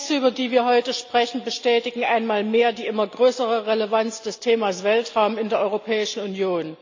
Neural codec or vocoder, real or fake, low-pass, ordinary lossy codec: none; real; 7.2 kHz; AAC, 48 kbps